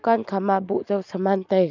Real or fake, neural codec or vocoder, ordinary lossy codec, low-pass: real; none; none; 7.2 kHz